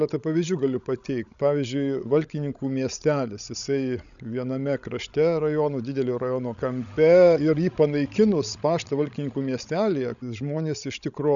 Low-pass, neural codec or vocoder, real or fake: 7.2 kHz; codec, 16 kHz, 16 kbps, FreqCodec, larger model; fake